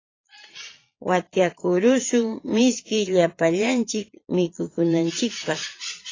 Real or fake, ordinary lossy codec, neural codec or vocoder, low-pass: fake; AAC, 32 kbps; vocoder, 22.05 kHz, 80 mel bands, Vocos; 7.2 kHz